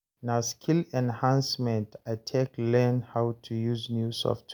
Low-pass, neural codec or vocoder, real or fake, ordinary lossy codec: none; none; real; none